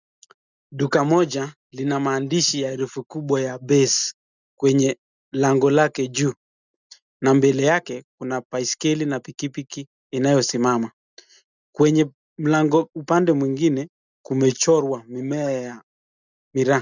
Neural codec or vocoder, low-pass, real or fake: none; 7.2 kHz; real